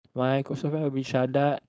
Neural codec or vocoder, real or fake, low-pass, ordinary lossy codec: codec, 16 kHz, 4.8 kbps, FACodec; fake; none; none